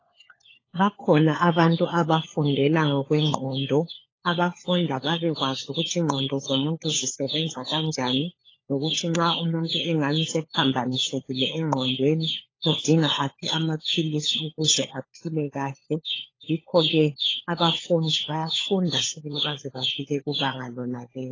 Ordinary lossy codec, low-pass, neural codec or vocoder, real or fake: AAC, 32 kbps; 7.2 kHz; codec, 16 kHz, 4 kbps, FunCodec, trained on LibriTTS, 50 frames a second; fake